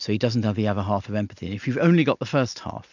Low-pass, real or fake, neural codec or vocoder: 7.2 kHz; real; none